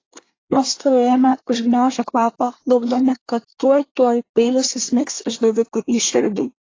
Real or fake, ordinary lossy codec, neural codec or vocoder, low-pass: fake; AAC, 32 kbps; codec, 24 kHz, 1 kbps, SNAC; 7.2 kHz